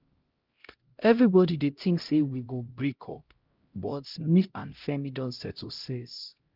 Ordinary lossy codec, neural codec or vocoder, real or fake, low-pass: Opus, 24 kbps; codec, 16 kHz, 0.5 kbps, X-Codec, HuBERT features, trained on LibriSpeech; fake; 5.4 kHz